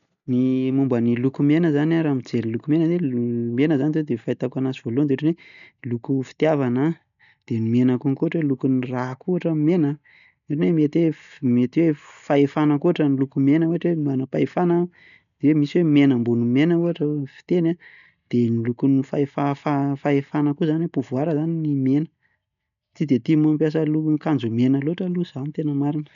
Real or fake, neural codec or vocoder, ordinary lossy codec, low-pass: real; none; none; 7.2 kHz